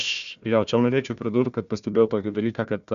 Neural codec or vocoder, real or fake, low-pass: codec, 16 kHz, 1 kbps, FunCodec, trained on Chinese and English, 50 frames a second; fake; 7.2 kHz